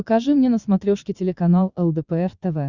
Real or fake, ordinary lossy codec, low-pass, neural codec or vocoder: fake; Opus, 64 kbps; 7.2 kHz; vocoder, 22.05 kHz, 80 mel bands, Vocos